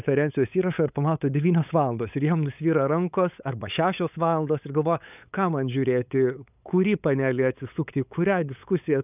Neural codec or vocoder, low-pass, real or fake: codec, 16 kHz, 8 kbps, FunCodec, trained on LibriTTS, 25 frames a second; 3.6 kHz; fake